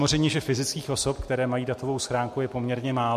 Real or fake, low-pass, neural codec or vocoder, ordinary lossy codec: real; 14.4 kHz; none; MP3, 64 kbps